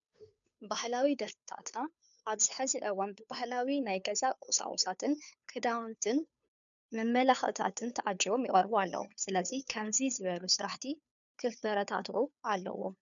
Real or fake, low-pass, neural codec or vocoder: fake; 7.2 kHz; codec, 16 kHz, 2 kbps, FunCodec, trained on Chinese and English, 25 frames a second